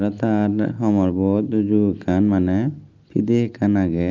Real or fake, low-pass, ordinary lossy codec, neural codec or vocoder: real; 7.2 kHz; Opus, 32 kbps; none